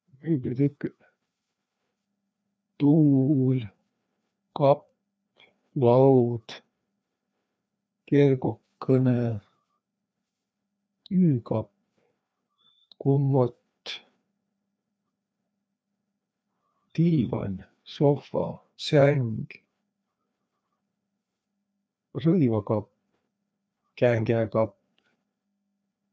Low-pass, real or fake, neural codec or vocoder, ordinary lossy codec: none; fake; codec, 16 kHz, 2 kbps, FreqCodec, larger model; none